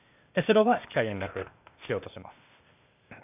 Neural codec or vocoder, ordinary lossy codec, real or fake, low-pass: codec, 16 kHz, 0.8 kbps, ZipCodec; none; fake; 3.6 kHz